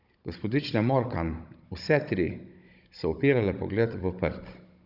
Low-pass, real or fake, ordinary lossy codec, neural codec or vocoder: 5.4 kHz; fake; Opus, 64 kbps; codec, 16 kHz, 16 kbps, FunCodec, trained on Chinese and English, 50 frames a second